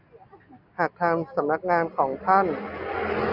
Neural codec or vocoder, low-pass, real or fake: none; 5.4 kHz; real